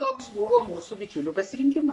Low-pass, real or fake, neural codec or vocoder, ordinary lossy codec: 10.8 kHz; fake; codec, 44.1 kHz, 3.4 kbps, Pupu-Codec; AAC, 64 kbps